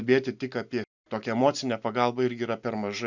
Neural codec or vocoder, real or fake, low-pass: none; real; 7.2 kHz